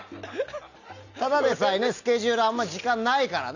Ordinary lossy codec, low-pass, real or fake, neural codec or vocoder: none; 7.2 kHz; real; none